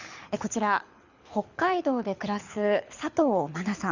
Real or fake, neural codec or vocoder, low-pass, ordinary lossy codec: fake; codec, 24 kHz, 6 kbps, HILCodec; 7.2 kHz; Opus, 64 kbps